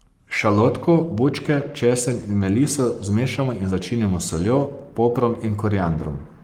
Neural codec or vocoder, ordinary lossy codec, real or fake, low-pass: codec, 44.1 kHz, 7.8 kbps, Pupu-Codec; Opus, 32 kbps; fake; 19.8 kHz